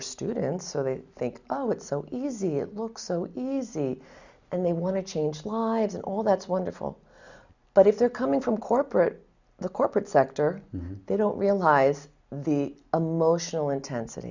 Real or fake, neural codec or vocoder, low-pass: real; none; 7.2 kHz